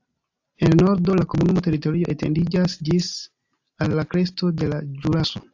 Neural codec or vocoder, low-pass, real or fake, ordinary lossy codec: none; 7.2 kHz; real; AAC, 48 kbps